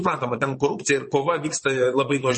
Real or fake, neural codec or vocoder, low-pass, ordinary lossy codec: fake; vocoder, 22.05 kHz, 80 mel bands, Vocos; 9.9 kHz; MP3, 32 kbps